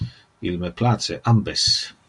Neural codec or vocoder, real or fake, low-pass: none; real; 10.8 kHz